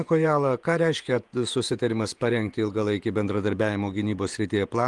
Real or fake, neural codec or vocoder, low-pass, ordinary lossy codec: fake; vocoder, 48 kHz, 128 mel bands, Vocos; 10.8 kHz; Opus, 24 kbps